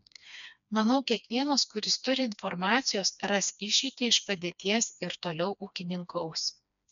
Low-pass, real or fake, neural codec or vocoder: 7.2 kHz; fake; codec, 16 kHz, 2 kbps, FreqCodec, smaller model